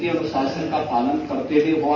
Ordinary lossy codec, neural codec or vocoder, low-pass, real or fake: MP3, 32 kbps; none; 7.2 kHz; real